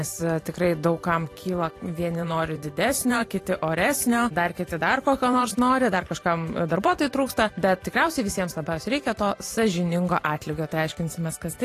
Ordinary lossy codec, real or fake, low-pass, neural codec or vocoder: AAC, 48 kbps; fake; 14.4 kHz; vocoder, 44.1 kHz, 128 mel bands every 512 samples, BigVGAN v2